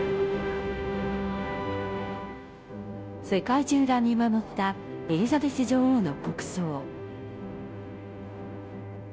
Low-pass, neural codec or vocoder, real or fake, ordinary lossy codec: none; codec, 16 kHz, 0.5 kbps, FunCodec, trained on Chinese and English, 25 frames a second; fake; none